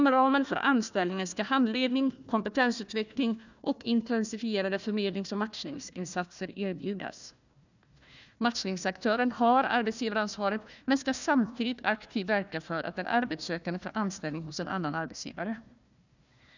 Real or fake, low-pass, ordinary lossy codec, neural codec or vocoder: fake; 7.2 kHz; none; codec, 16 kHz, 1 kbps, FunCodec, trained on Chinese and English, 50 frames a second